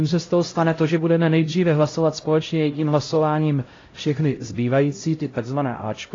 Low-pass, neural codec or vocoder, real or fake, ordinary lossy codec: 7.2 kHz; codec, 16 kHz, 0.5 kbps, X-Codec, HuBERT features, trained on LibriSpeech; fake; AAC, 32 kbps